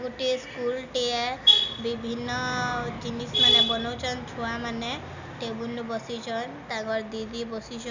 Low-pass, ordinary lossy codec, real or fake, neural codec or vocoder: 7.2 kHz; none; real; none